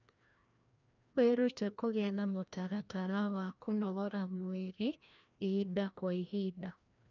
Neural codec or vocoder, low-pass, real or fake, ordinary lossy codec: codec, 16 kHz, 1 kbps, FreqCodec, larger model; 7.2 kHz; fake; none